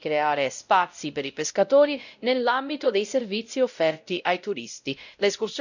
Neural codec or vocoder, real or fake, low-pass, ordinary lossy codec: codec, 16 kHz, 0.5 kbps, X-Codec, WavLM features, trained on Multilingual LibriSpeech; fake; 7.2 kHz; none